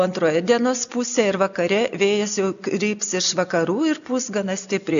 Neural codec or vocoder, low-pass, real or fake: none; 7.2 kHz; real